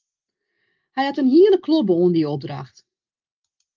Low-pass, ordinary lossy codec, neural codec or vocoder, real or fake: 7.2 kHz; Opus, 24 kbps; none; real